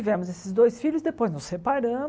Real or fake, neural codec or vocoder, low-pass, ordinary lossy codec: real; none; none; none